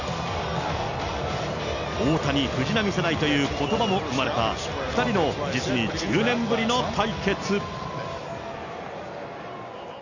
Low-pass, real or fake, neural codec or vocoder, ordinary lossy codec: 7.2 kHz; real; none; none